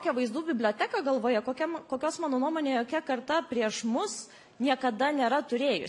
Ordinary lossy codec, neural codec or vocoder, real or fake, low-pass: AAC, 64 kbps; none; real; 10.8 kHz